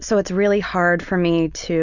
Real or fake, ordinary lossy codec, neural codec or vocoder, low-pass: real; Opus, 64 kbps; none; 7.2 kHz